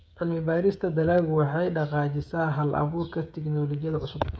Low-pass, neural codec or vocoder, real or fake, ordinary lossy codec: none; codec, 16 kHz, 16 kbps, FreqCodec, smaller model; fake; none